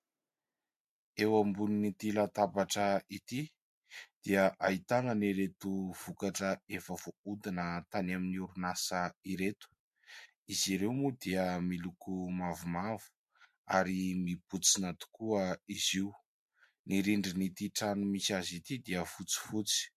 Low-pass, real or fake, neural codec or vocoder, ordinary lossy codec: 14.4 kHz; real; none; MP3, 64 kbps